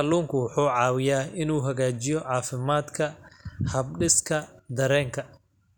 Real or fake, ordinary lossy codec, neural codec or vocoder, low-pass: real; none; none; none